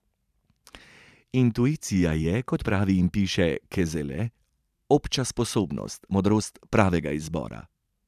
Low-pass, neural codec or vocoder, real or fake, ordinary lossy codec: 14.4 kHz; vocoder, 44.1 kHz, 128 mel bands every 512 samples, BigVGAN v2; fake; none